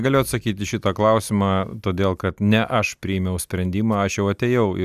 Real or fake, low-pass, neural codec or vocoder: real; 14.4 kHz; none